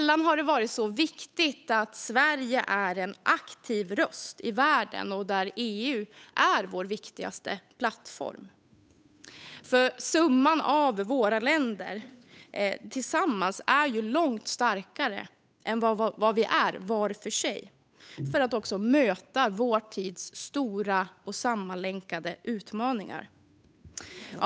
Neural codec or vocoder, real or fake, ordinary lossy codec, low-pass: codec, 16 kHz, 8 kbps, FunCodec, trained on Chinese and English, 25 frames a second; fake; none; none